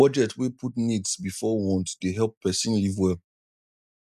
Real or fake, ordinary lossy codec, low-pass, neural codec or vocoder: real; none; 14.4 kHz; none